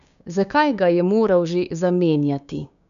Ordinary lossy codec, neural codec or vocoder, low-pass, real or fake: none; codec, 16 kHz, 6 kbps, DAC; 7.2 kHz; fake